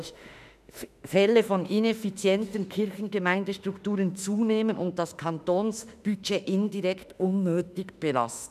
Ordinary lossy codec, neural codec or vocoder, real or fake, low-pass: none; autoencoder, 48 kHz, 32 numbers a frame, DAC-VAE, trained on Japanese speech; fake; 14.4 kHz